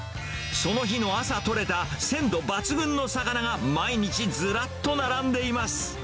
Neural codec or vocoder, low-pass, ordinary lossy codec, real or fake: none; none; none; real